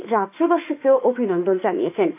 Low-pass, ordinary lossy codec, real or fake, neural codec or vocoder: 3.6 kHz; AAC, 32 kbps; fake; autoencoder, 48 kHz, 32 numbers a frame, DAC-VAE, trained on Japanese speech